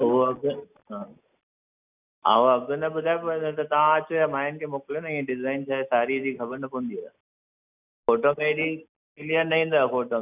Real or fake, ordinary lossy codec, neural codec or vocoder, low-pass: real; none; none; 3.6 kHz